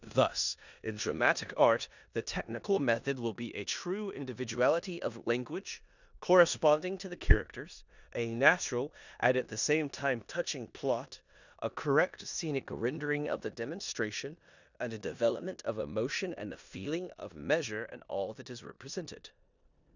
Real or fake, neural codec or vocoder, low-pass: fake; codec, 16 kHz in and 24 kHz out, 0.9 kbps, LongCat-Audio-Codec, four codebook decoder; 7.2 kHz